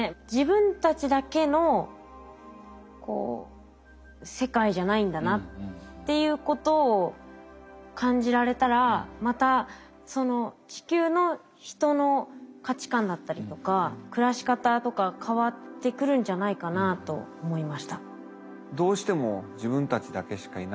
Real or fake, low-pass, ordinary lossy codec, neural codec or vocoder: real; none; none; none